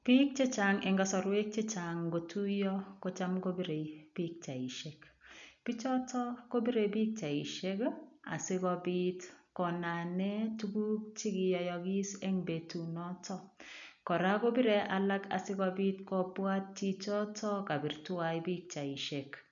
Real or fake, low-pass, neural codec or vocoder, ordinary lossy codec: real; 7.2 kHz; none; none